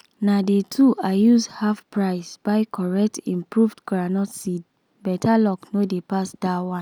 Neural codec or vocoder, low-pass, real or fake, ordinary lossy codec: none; 19.8 kHz; real; none